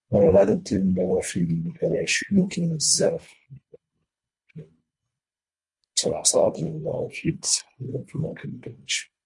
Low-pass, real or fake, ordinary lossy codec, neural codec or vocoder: 10.8 kHz; fake; MP3, 48 kbps; codec, 24 kHz, 1.5 kbps, HILCodec